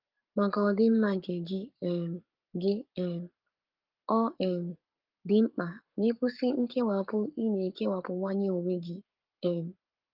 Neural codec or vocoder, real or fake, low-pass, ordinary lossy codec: codec, 44.1 kHz, 7.8 kbps, Pupu-Codec; fake; 5.4 kHz; Opus, 32 kbps